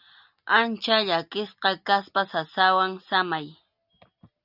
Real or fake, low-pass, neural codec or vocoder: real; 5.4 kHz; none